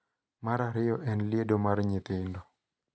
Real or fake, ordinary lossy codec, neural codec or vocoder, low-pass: real; none; none; none